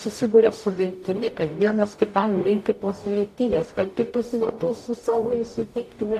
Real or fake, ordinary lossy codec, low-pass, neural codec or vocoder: fake; AAC, 64 kbps; 14.4 kHz; codec, 44.1 kHz, 0.9 kbps, DAC